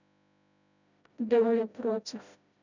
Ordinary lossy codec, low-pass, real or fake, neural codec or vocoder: none; 7.2 kHz; fake; codec, 16 kHz, 0.5 kbps, FreqCodec, smaller model